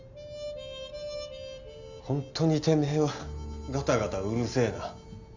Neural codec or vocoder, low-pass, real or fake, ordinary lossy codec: none; 7.2 kHz; real; Opus, 64 kbps